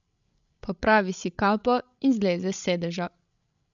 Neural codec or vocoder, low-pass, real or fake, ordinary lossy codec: codec, 16 kHz, 16 kbps, FreqCodec, larger model; 7.2 kHz; fake; none